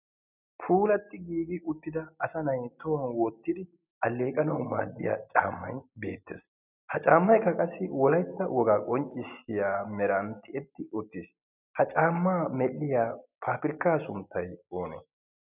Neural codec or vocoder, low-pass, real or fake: none; 3.6 kHz; real